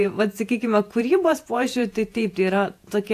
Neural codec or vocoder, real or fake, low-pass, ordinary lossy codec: vocoder, 48 kHz, 128 mel bands, Vocos; fake; 14.4 kHz; AAC, 64 kbps